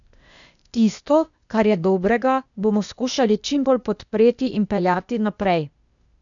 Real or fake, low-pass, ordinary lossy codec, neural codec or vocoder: fake; 7.2 kHz; none; codec, 16 kHz, 0.8 kbps, ZipCodec